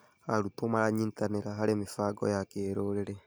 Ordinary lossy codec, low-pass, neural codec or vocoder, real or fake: none; none; none; real